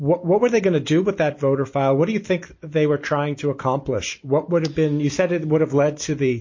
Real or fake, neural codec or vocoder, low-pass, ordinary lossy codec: real; none; 7.2 kHz; MP3, 32 kbps